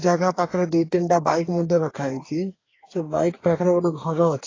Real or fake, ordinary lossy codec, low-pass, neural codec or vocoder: fake; AAC, 32 kbps; 7.2 kHz; codec, 44.1 kHz, 2.6 kbps, DAC